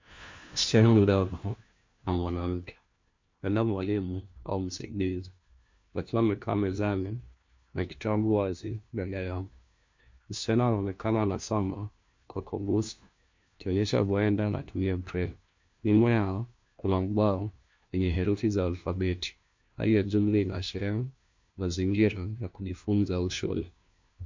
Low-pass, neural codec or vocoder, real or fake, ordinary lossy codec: 7.2 kHz; codec, 16 kHz, 1 kbps, FunCodec, trained on LibriTTS, 50 frames a second; fake; MP3, 48 kbps